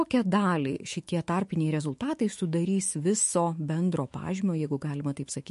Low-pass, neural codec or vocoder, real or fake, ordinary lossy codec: 14.4 kHz; autoencoder, 48 kHz, 128 numbers a frame, DAC-VAE, trained on Japanese speech; fake; MP3, 48 kbps